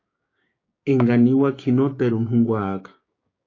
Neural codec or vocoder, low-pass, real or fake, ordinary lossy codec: codec, 16 kHz, 6 kbps, DAC; 7.2 kHz; fake; AAC, 32 kbps